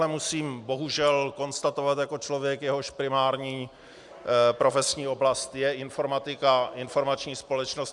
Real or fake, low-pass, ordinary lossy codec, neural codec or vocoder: real; 10.8 kHz; MP3, 96 kbps; none